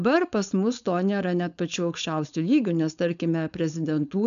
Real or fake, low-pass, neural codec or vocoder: fake; 7.2 kHz; codec, 16 kHz, 4.8 kbps, FACodec